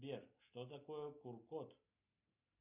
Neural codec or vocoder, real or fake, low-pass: none; real; 3.6 kHz